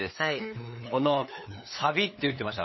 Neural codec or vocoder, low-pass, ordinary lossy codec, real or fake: codec, 16 kHz, 8 kbps, FunCodec, trained on LibriTTS, 25 frames a second; 7.2 kHz; MP3, 24 kbps; fake